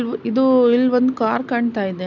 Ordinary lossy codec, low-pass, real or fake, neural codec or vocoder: none; 7.2 kHz; real; none